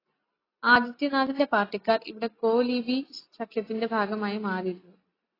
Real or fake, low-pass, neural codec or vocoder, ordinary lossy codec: real; 5.4 kHz; none; AAC, 24 kbps